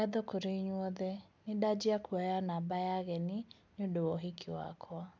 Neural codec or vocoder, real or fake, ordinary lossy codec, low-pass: none; real; none; none